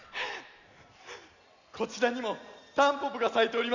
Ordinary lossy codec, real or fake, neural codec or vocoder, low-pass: none; real; none; 7.2 kHz